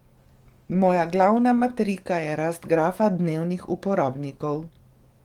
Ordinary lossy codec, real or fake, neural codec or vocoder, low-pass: Opus, 24 kbps; fake; codec, 44.1 kHz, 7.8 kbps, DAC; 19.8 kHz